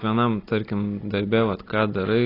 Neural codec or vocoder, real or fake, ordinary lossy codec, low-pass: none; real; AAC, 24 kbps; 5.4 kHz